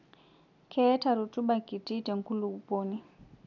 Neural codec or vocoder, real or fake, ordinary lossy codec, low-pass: none; real; none; 7.2 kHz